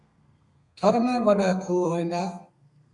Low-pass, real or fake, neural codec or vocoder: 10.8 kHz; fake; codec, 32 kHz, 1.9 kbps, SNAC